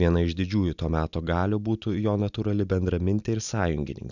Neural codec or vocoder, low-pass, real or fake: none; 7.2 kHz; real